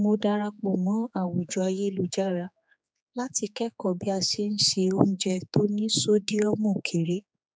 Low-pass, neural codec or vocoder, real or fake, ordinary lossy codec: none; codec, 16 kHz, 4 kbps, X-Codec, HuBERT features, trained on general audio; fake; none